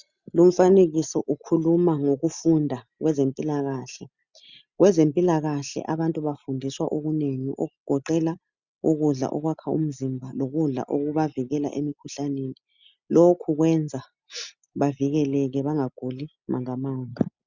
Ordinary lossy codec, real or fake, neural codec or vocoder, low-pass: Opus, 64 kbps; real; none; 7.2 kHz